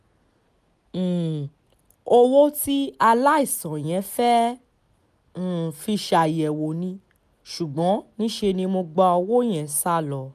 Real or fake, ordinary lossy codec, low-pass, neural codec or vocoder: real; none; 14.4 kHz; none